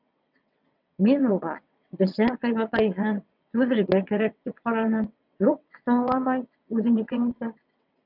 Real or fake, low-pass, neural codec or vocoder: fake; 5.4 kHz; vocoder, 22.05 kHz, 80 mel bands, WaveNeXt